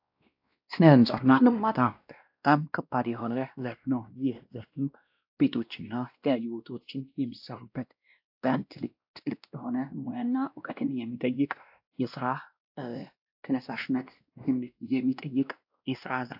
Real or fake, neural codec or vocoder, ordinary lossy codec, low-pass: fake; codec, 16 kHz, 1 kbps, X-Codec, WavLM features, trained on Multilingual LibriSpeech; AAC, 48 kbps; 5.4 kHz